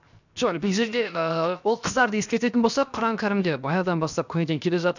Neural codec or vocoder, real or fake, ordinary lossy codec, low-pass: codec, 16 kHz, 0.7 kbps, FocalCodec; fake; none; 7.2 kHz